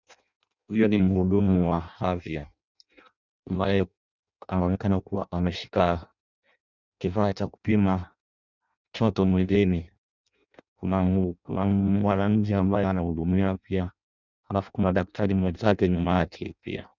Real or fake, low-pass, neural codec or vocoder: fake; 7.2 kHz; codec, 16 kHz in and 24 kHz out, 0.6 kbps, FireRedTTS-2 codec